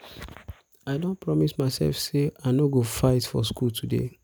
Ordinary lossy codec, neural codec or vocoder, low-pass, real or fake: none; none; none; real